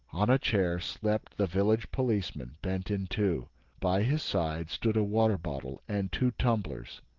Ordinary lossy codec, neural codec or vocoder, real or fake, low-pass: Opus, 16 kbps; none; real; 7.2 kHz